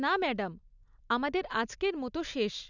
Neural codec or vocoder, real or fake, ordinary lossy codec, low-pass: none; real; none; 7.2 kHz